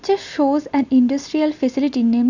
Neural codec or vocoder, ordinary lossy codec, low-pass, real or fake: none; none; 7.2 kHz; real